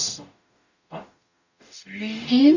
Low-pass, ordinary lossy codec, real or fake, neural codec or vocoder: 7.2 kHz; none; fake; codec, 44.1 kHz, 0.9 kbps, DAC